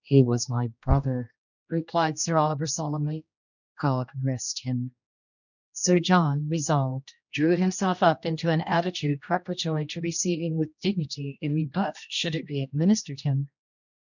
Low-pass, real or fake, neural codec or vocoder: 7.2 kHz; fake; codec, 16 kHz, 1 kbps, X-Codec, HuBERT features, trained on general audio